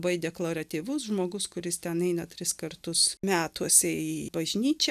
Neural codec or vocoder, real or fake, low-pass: none; real; 14.4 kHz